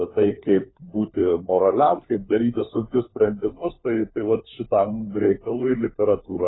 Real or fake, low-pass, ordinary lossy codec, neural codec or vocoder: fake; 7.2 kHz; AAC, 16 kbps; codec, 16 kHz, 4 kbps, FunCodec, trained on LibriTTS, 50 frames a second